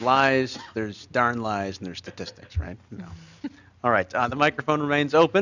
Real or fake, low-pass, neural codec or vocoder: real; 7.2 kHz; none